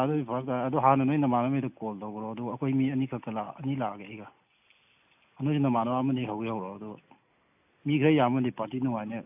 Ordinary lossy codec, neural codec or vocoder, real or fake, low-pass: AAC, 32 kbps; none; real; 3.6 kHz